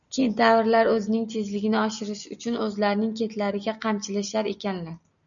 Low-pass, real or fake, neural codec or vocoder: 7.2 kHz; real; none